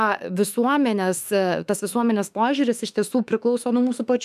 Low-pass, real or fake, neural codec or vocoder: 14.4 kHz; fake; autoencoder, 48 kHz, 32 numbers a frame, DAC-VAE, trained on Japanese speech